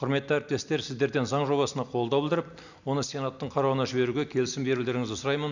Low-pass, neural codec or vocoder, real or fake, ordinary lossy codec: 7.2 kHz; none; real; none